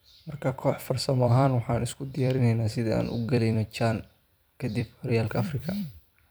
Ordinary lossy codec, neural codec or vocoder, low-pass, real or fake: none; vocoder, 44.1 kHz, 128 mel bands every 256 samples, BigVGAN v2; none; fake